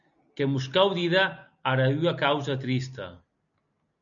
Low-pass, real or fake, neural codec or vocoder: 7.2 kHz; real; none